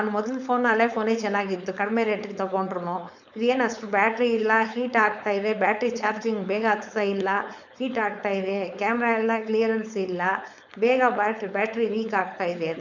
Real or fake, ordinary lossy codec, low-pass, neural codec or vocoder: fake; none; 7.2 kHz; codec, 16 kHz, 4.8 kbps, FACodec